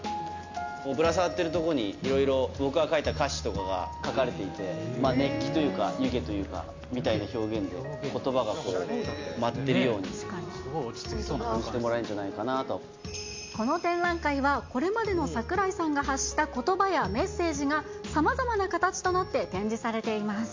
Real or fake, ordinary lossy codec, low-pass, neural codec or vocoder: real; MP3, 64 kbps; 7.2 kHz; none